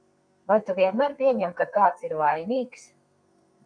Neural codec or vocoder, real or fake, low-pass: codec, 32 kHz, 1.9 kbps, SNAC; fake; 9.9 kHz